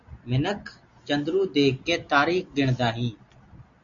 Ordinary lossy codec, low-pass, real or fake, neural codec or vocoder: MP3, 64 kbps; 7.2 kHz; real; none